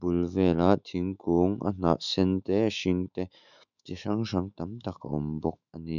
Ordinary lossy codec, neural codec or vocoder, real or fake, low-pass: none; autoencoder, 48 kHz, 128 numbers a frame, DAC-VAE, trained on Japanese speech; fake; 7.2 kHz